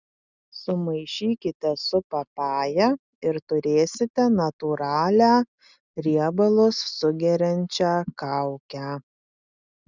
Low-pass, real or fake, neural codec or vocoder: 7.2 kHz; real; none